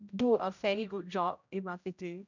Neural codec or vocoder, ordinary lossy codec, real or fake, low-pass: codec, 16 kHz, 0.5 kbps, X-Codec, HuBERT features, trained on general audio; none; fake; 7.2 kHz